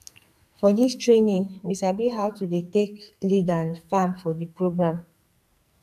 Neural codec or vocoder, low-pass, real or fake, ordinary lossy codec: codec, 44.1 kHz, 2.6 kbps, SNAC; 14.4 kHz; fake; none